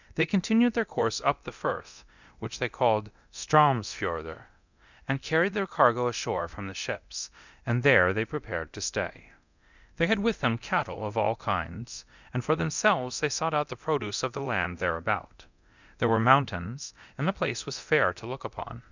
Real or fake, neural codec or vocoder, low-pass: fake; codec, 24 kHz, 0.9 kbps, DualCodec; 7.2 kHz